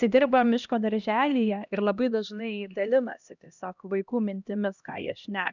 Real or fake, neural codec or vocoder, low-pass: fake; codec, 16 kHz, 1 kbps, X-Codec, HuBERT features, trained on LibriSpeech; 7.2 kHz